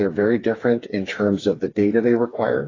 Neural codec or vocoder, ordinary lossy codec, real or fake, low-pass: codec, 16 kHz, 4 kbps, FreqCodec, smaller model; AAC, 32 kbps; fake; 7.2 kHz